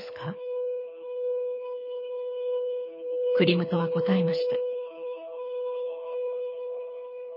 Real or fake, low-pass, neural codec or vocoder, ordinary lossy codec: fake; 5.4 kHz; codec, 24 kHz, 6 kbps, HILCodec; MP3, 24 kbps